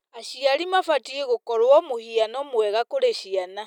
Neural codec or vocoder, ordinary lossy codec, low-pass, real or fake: none; none; 19.8 kHz; real